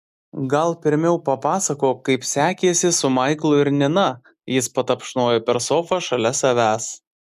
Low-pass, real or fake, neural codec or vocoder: 14.4 kHz; real; none